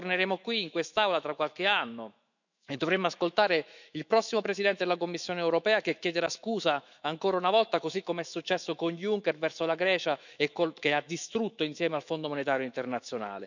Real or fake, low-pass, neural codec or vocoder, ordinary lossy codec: fake; 7.2 kHz; autoencoder, 48 kHz, 128 numbers a frame, DAC-VAE, trained on Japanese speech; none